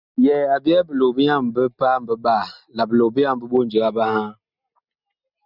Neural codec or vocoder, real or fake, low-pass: none; real; 5.4 kHz